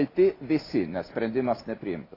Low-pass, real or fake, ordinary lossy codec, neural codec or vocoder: 5.4 kHz; real; AAC, 24 kbps; none